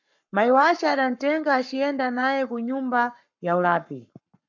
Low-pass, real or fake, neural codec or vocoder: 7.2 kHz; fake; codec, 44.1 kHz, 7.8 kbps, Pupu-Codec